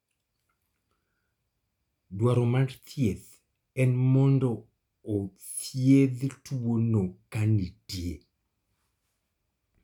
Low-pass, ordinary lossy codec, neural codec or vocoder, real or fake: 19.8 kHz; none; none; real